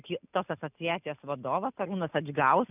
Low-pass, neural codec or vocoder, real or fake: 3.6 kHz; none; real